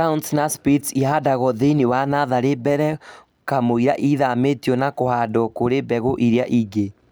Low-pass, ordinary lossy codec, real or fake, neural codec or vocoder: none; none; real; none